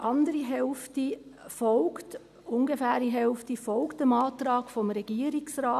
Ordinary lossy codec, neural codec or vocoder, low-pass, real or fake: none; none; 14.4 kHz; real